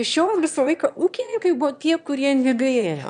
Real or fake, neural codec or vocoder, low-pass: fake; autoencoder, 22.05 kHz, a latent of 192 numbers a frame, VITS, trained on one speaker; 9.9 kHz